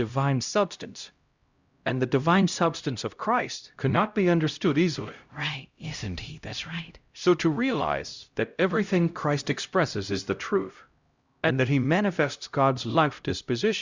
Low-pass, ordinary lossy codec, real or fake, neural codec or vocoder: 7.2 kHz; Opus, 64 kbps; fake; codec, 16 kHz, 0.5 kbps, X-Codec, HuBERT features, trained on LibriSpeech